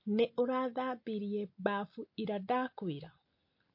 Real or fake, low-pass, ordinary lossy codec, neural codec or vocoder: real; 5.4 kHz; MP3, 32 kbps; none